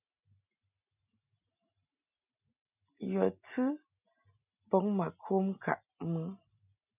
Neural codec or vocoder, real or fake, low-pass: none; real; 3.6 kHz